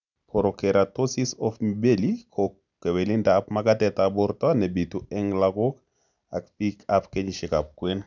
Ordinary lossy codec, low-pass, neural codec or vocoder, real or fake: none; 7.2 kHz; none; real